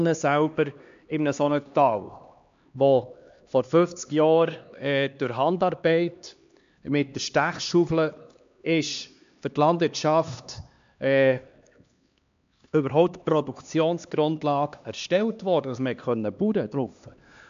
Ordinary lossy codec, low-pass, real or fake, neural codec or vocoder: MP3, 64 kbps; 7.2 kHz; fake; codec, 16 kHz, 2 kbps, X-Codec, HuBERT features, trained on LibriSpeech